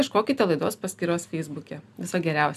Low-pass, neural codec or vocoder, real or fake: 14.4 kHz; none; real